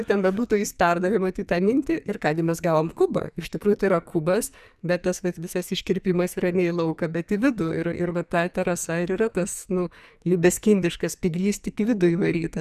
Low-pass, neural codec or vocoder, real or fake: 14.4 kHz; codec, 44.1 kHz, 2.6 kbps, SNAC; fake